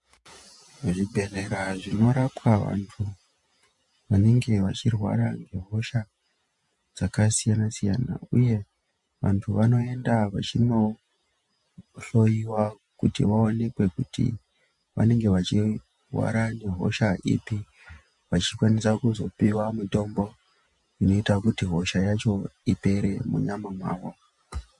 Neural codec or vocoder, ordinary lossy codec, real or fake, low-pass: vocoder, 48 kHz, 128 mel bands, Vocos; MP3, 64 kbps; fake; 10.8 kHz